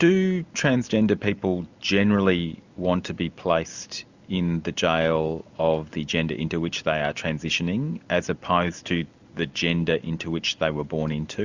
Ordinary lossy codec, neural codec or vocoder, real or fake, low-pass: Opus, 64 kbps; none; real; 7.2 kHz